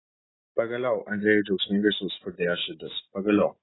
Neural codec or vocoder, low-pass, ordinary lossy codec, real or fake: none; 7.2 kHz; AAC, 16 kbps; real